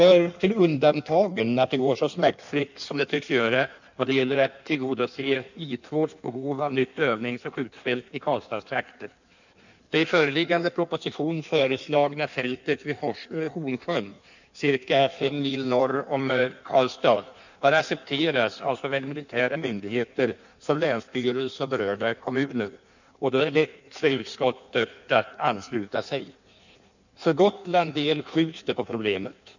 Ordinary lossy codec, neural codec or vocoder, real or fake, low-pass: none; codec, 16 kHz in and 24 kHz out, 1.1 kbps, FireRedTTS-2 codec; fake; 7.2 kHz